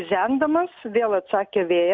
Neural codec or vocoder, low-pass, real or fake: none; 7.2 kHz; real